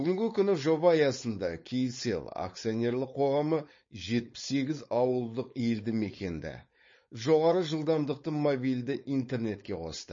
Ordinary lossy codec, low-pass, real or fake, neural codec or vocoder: MP3, 32 kbps; 7.2 kHz; fake; codec, 16 kHz, 4.8 kbps, FACodec